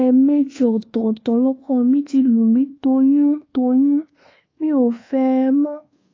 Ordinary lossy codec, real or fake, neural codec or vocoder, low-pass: AAC, 32 kbps; fake; codec, 24 kHz, 1.2 kbps, DualCodec; 7.2 kHz